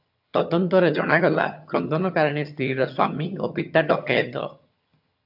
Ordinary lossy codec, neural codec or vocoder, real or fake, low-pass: AAC, 48 kbps; vocoder, 22.05 kHz, 80 mel bands, HiFi-GAN; fake; 5.4 kHz